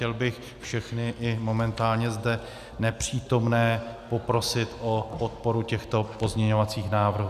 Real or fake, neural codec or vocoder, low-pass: real; none; 14.4 kHz